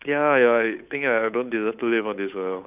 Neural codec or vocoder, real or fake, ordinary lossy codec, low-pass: codec, 16 kHz, 8 kbps, FunCodec, trained on Chinese and English, 25 frames a second; fake; none; 3.6 kHz